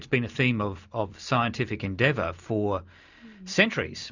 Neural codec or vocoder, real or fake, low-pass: none; real; 7.2 kHz